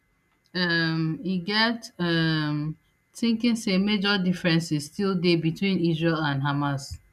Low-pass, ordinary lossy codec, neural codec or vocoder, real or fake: 14.4 kHz; none; none; real